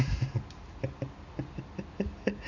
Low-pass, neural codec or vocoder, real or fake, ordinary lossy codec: 7.2 kHz; none; real; MP3, 64 kbps